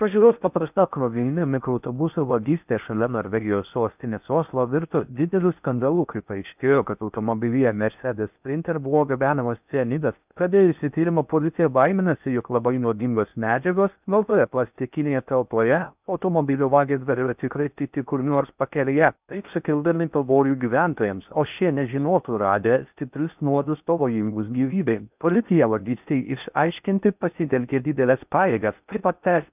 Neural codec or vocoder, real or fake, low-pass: codec, 16 kHz in and 24 kHz out, 0.6 kbps, FocalCodec, streaming, 4096 codes; fake; 3.6 kHz